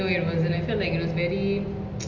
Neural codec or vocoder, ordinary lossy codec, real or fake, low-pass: none; MP3, 48 kbps; real; 7.2 kHz